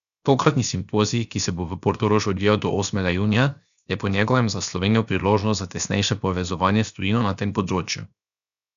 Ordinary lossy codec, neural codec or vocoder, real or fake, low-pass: none; codec, 16 kHz, 0.7 kbps, FocalCodec; fake; 7.2 kHz